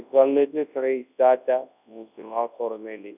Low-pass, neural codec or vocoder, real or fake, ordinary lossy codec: 3.6 kHz; codec, 24 kHz, 0.9 kbps, WavTokenizer, large speech release; fake; none